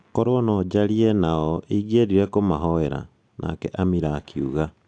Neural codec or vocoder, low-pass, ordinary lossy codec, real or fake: none; 9.9 kHz; AAC, 64 kbps; real